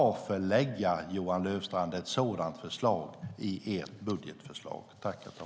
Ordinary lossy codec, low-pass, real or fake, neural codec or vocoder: none; none; real; none